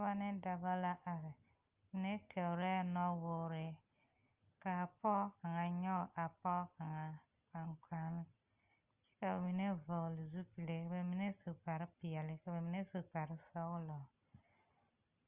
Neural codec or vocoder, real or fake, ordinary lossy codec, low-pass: none; real; Opus, 24 kbps; 3.6 kHz